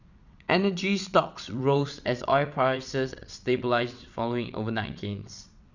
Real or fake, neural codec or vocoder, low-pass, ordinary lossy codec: fake; vocoder, 22.05 kHz, 80 mel bands, WaveNeXt; 7.2 kHz; none